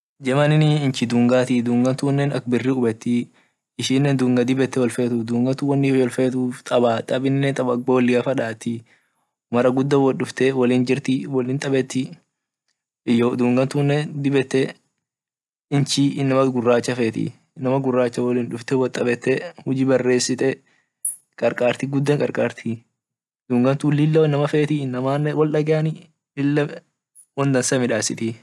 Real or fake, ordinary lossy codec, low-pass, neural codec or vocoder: real; none; none; none